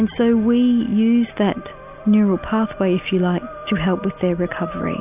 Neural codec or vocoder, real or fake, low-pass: none; real; 3.6 kHz